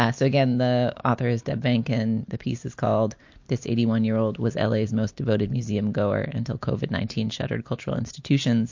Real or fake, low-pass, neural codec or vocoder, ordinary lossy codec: real; 7.2 kHz; none; MP3, 48 kbps